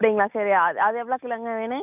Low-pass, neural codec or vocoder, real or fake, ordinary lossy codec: 3.6 kHz; none; real; none